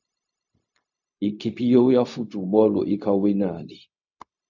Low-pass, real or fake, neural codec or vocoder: 7.2 kHz; fake; codec, 16 kHz, 0.4 kbps, LongCat-Audio-Codec